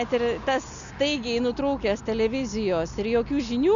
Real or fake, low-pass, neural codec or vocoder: real; 7.2 kHz; none